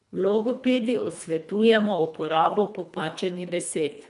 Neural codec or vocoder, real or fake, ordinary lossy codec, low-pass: codec, 24 kHz, 1.5 kbps, HILCodec; fake; none; 10.8 kHz